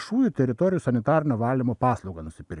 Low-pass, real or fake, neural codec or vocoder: 10.8 kHz; real; none